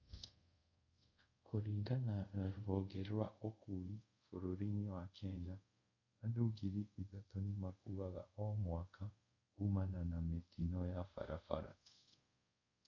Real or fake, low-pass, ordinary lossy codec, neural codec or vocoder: fake; 7.2 kHz; MP3, 48 kbps; codec, 24 kHz, 0.5 kbps, DualCodec